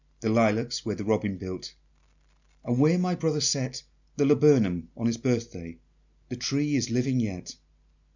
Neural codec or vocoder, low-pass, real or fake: none; 7.2 kHz; real